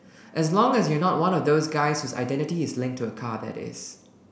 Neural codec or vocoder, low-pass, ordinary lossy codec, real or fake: none; none; none; real